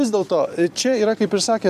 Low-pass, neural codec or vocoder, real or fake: 14.4 kHz; vocoder, 44.1 kHz, 128 mel bands every 256 samples, BigVGAN v2; fake